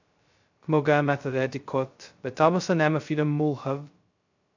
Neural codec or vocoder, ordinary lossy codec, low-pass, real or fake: codec, 16 kHz, 0.2 kbps, FocalCodec; AAC, 48 kbps; 7.2 kHz; fake